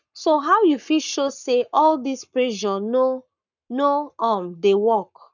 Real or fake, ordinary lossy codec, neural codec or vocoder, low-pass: fake; none; codec, 44.1 kHz, 7.8 kbps, Pupu-Codec; 7.2 kHz